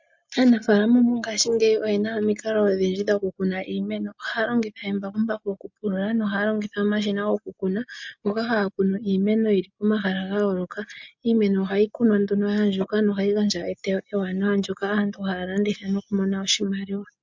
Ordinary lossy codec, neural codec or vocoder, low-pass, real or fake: MP3, 48 kbps; none; 7.2 kHz; real